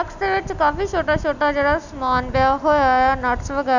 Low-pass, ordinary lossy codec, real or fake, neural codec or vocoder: 7.2 kHz; none; real; none